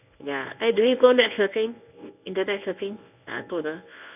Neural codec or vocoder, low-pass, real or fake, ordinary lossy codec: codec, 24 kHz, 0.9 kbps, WavTokenizer, medium speech release version 1; 3.6 kHz; fake; none